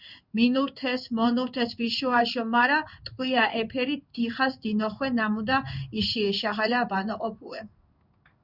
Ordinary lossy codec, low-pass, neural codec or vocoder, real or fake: Opus, 64 kbps; 5.4 kHz; codec, 16 kHz in and 24 kHz out, 1 kbps, XY-Tokenizer; fake